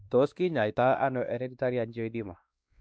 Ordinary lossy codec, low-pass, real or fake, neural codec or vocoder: none; none; fake; codec, 16 kHz, 2 kbps, X-Codec, WavLM features, trained on Multilingual LibriSpeech